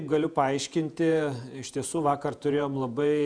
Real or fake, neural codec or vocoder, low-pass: fake; vocoder, 48 kHz, 128 mel bands, Vocos; 9.9 kHz